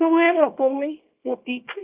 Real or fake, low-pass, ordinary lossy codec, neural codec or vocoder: fake; 3.6 kHz; Opus, 24 kbps; codec, 24 kHz, 0.9 kbps, WavTokenizer, small release